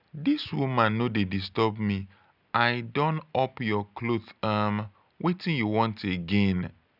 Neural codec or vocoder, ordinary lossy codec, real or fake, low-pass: none; none; real; 5.4 kHz